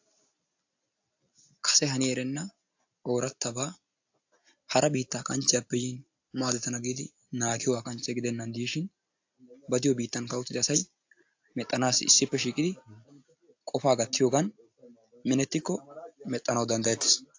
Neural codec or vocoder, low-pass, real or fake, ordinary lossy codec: none; 7.2 kHz; real; AAC, 48 kbps